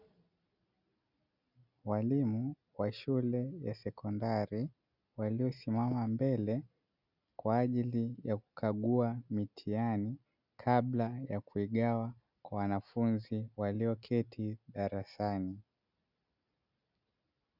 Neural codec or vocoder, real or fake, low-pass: none; real; 5.4 kHz